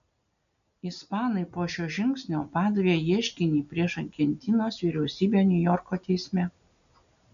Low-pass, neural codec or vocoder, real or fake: 7.2 kHz; none; real